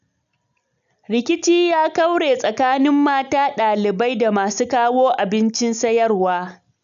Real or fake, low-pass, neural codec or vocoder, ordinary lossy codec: real; 7.2 kHz; none; none